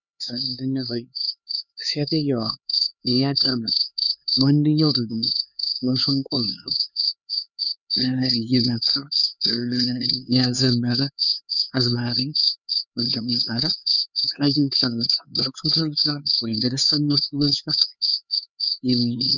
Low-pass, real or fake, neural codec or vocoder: 7.2 kHz; fake; codec, 16 kHz, 4 kbps, X-Codec, HuBERT features, trained on LibriSpeech